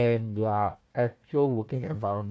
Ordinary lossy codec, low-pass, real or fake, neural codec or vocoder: none; none; fake; codec, 16 kHz, 1 kbps, FunCodec, trained on Chinese and English, 50 frames a second